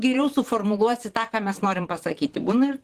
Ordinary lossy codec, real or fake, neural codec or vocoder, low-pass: Opus, 16 kbps; fake; vocoder, 44.1 kHz, 128 mel bands, Pupu-Vocoder; 14.4 kHz